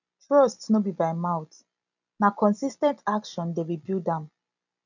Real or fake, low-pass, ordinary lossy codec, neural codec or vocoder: real; 7.2 kHz; none; none